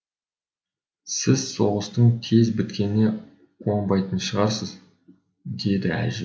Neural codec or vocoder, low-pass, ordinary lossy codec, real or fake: none; none; none; real